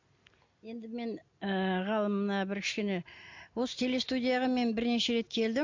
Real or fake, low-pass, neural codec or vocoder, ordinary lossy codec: real; 7.2 kHz; none; MP3, 48 kbps